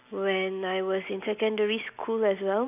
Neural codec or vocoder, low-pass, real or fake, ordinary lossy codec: none; 3.6 kHz; real; none